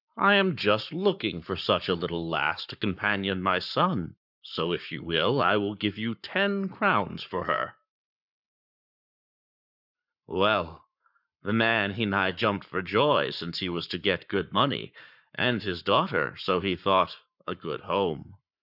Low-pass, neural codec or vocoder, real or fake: 5.4 kHz; codec, 44.1 kHz, 7.8 kbps, Pupu-Codec; fake